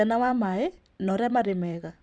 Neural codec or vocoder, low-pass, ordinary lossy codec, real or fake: vocoder, 44.1 kHz, 128 mel bands every 512 samples, BigVGAN v2; 9.9 kHz; Opus, 64 kbps; fake